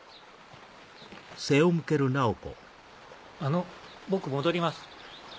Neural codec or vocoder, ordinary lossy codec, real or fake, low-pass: none; none; real; none